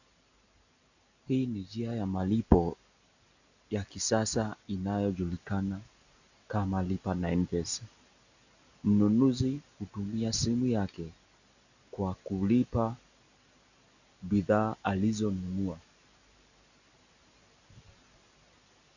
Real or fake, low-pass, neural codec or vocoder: real; 7.2 kHz; none